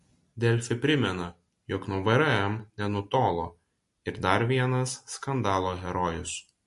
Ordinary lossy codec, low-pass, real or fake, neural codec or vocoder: MP3, 48 kbps; 14.4 kHz; real; none